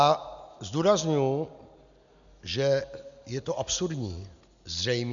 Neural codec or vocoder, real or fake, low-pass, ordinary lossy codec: none; real; 7.2 kHz; MP3, 96 kbps